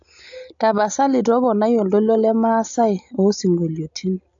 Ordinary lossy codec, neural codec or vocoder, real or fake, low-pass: AAC, 64 kbps; none; real; 7.2 kHz